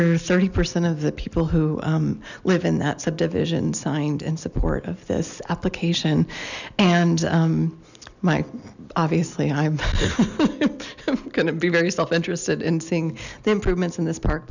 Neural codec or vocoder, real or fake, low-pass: none; real; 7.2 kHz